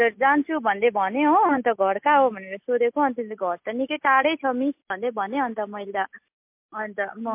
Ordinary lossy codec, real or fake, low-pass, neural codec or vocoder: MP3, 32 kbps; real; 3.6 kHz; none